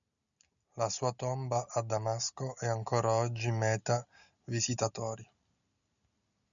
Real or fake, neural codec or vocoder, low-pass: real; none; 7.2 kHz